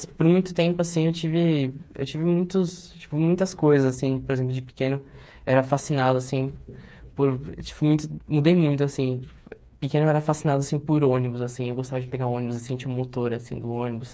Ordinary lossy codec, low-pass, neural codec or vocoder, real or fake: none; none; codec, 16 kHz, 4 kbps, FreqCodec, smaller model; fake